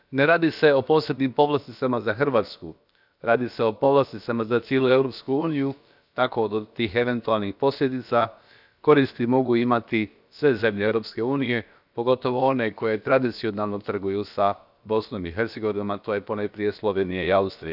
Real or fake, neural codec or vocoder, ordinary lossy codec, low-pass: fake; codec, 16 kHz, about 1 kbps, DyCAST, with the encoder's durations; none; 5.4 kHz